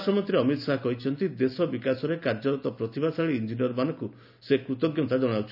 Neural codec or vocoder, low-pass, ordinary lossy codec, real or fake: none; 5.4 kHz; none; real